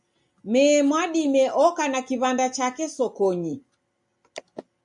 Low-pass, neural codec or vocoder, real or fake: 10.8 kHz; none; real